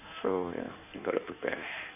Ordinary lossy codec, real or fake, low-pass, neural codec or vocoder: none; fake; 3.6 kHz; codec, 16 kHz in and 24 kHz out, 1.1 kbps, FireRedTTS-2 codec